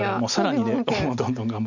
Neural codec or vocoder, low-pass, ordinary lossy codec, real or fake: none; 7.2 kHz; none; real